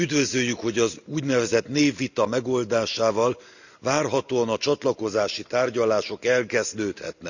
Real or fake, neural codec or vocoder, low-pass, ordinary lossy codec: real; none; 7.2 kHz; none